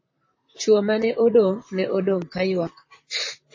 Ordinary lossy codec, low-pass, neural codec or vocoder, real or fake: MP3, 32 kbps; 7.2 kHz; vocoder, 44.1 kHz, 128 mel bands, Pupu-Vocoder; fake